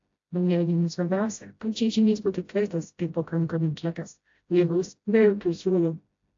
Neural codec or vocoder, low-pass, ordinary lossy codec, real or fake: codec, 16 kHz, 0.5 kbps, FreqCodec, smaller model; 7.2 kHz; AAC, 48 kbps; fake